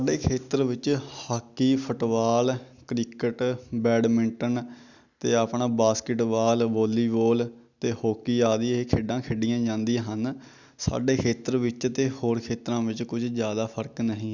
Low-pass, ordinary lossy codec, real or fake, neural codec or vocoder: 7.2 kHz; none; real; none